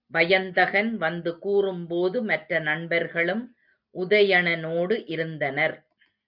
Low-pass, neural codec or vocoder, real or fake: 5.4 kHz; none; real